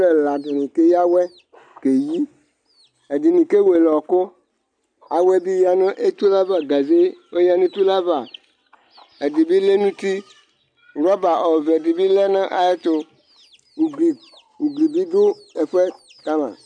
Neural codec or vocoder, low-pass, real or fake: none; 9.9 kHz; real